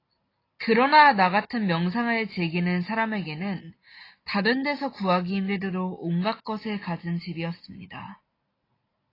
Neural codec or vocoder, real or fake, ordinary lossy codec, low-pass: none; real; AAC, 24 kbps; 5.4 kHz